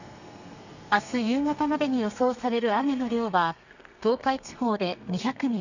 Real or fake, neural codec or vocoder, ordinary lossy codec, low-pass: fake; codec, 32 kHz, 1.9 kbps, SNAC; none; 7.2 kHz